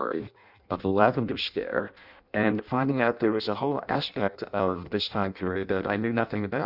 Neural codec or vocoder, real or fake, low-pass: codec, 16 kHz in and 24 kHz out, 0.6 kbps, FireRedTTS-2 codec; fake; 5.4 kHz